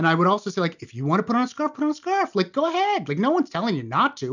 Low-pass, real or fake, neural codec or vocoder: 7.2 kHz; real; none